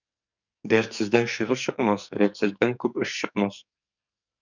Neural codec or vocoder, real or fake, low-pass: codec, 44.1 kHz, 2.6 kbps, SNAC; fake; 7.2 kHz